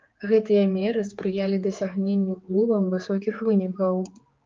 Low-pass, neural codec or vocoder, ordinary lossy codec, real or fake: 7.2 kHz; codec, 16 kHz, 4 kbps, X-Codec, HuBERT features, trained on general audio; Opus, 24 kbps; fake